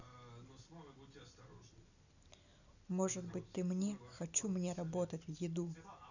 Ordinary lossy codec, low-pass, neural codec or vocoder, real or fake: none; 7.2 kHz; none; real